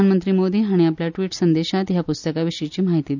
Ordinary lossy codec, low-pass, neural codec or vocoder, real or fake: none; 7.2 kHz; none; real